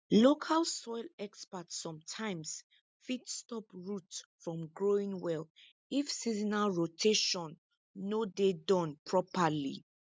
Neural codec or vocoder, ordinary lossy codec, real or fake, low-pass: none; none; real; none